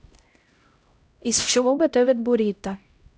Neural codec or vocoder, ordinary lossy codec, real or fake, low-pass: codec, 16 kHz, 0.5 kbps, X-Codec, HuBERT features, trained on LibriSpeech; none; fake; none